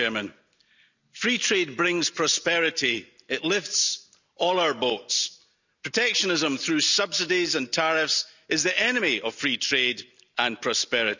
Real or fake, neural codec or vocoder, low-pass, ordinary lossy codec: real; none; 7.2 kHz; none